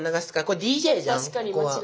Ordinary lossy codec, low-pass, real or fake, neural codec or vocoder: none; none; real; none